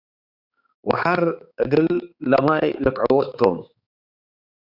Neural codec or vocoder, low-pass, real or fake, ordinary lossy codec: codec, 16 kHz, 4 kbps, X-Codec, HuBERT features, trained on balanced general audio; 5.4 kHz; fake; Opus, 64 kbps